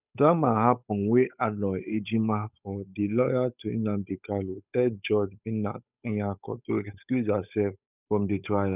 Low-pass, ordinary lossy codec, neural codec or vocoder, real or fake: 3.6 kHz; none; codec, 16 kHz, 8 kbps, FunCodec, trained on Chinese and English, 25 frames a second; fake